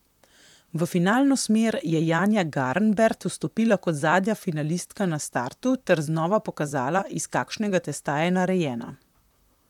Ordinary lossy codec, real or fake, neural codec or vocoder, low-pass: none; fake; vocoder, 44.1 kHz, 128 mel bands, Pupu-Vocoder; 19.8 kHz